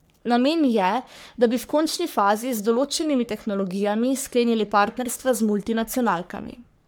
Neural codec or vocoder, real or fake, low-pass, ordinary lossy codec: codec, 44.1 kHz, 3.4 kbps, Pupu-Codec; fake; none; none